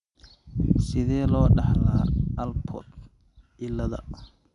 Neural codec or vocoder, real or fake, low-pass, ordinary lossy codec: none; real; 10.8 kHz; none